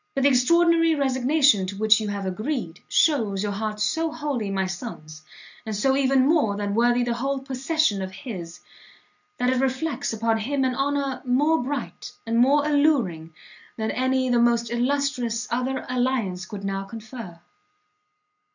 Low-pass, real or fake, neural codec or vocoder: 7.2 kHz; real; none